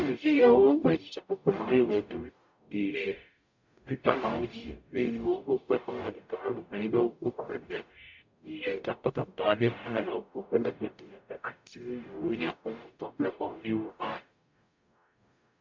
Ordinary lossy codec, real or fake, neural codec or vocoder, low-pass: MP3, 64 kbps; fake; codec, 44.1 kHz, 0.9 kbps, DAC; 7.2 kHz